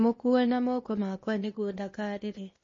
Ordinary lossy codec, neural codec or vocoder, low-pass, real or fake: MP3, 32 kbps; codec, 16 kHz, 0.8 kbps, ZipCodec; 7.2 kHz; fake